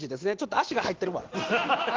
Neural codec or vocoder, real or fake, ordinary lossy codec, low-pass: none; real; Opus, 16 kbps; 7.2 kHz